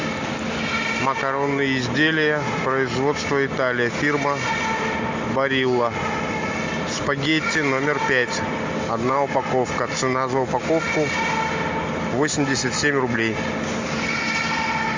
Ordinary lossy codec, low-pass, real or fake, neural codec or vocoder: MP3, 64 kbps; 7.2 kHz; real; none